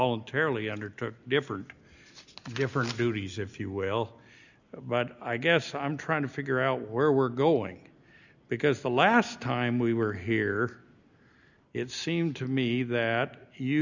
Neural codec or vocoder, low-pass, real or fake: none; 7.2 kHz; real